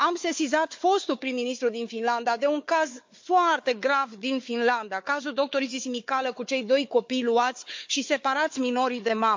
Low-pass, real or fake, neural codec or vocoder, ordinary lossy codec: 7.2 kHz; fake; codec, 16 kHz, 4 kbps, FunCodec, trained on Chinese and English, 50 frames a second; MP3, 48 kbps